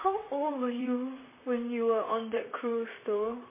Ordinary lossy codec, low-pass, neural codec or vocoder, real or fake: MP3, 16 kbps; 3.6 kHz; vocoder, 44.1 kHz, 128 mel bands, Pupu-Vocoder; fake